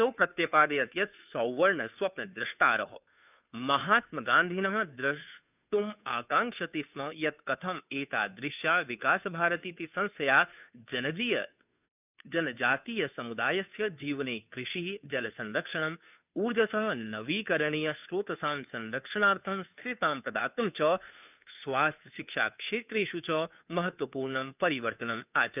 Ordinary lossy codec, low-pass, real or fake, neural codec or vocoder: none; 3.6 kHz; fake; codec, 16 kHz, 2 kbps, FunCodec, trained on Chinese and English, 25 frames a second